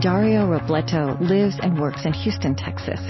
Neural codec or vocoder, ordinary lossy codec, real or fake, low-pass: none; MP3, 24 kbps; real; 7.2 kHz